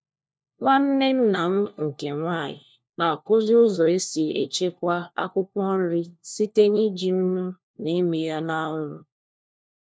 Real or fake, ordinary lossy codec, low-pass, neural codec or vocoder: fake; none; none; codec, 16 kHz, 1 kbps, FunCodec, trained on LibriTTS, 50 frames a second